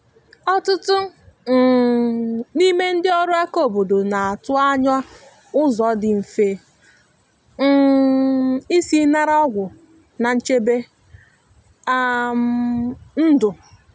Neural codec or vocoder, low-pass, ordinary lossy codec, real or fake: none; none; none; real